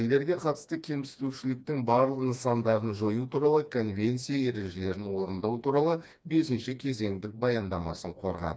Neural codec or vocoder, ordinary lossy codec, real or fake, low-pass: codec, 16 kHz, 2 kbps, FreqCodec, smaller model; none; fake; none